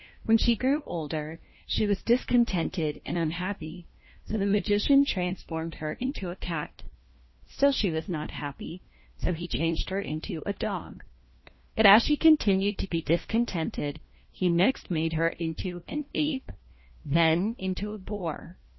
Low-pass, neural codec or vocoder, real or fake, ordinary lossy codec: 7.2 kHz; codec, 16 kHz, 1 kbps, FunCodec, trained on LibriTTS, 50 frames a second; fake; MP3, 24 kbps